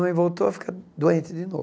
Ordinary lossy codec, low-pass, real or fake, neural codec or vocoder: none; none; real; none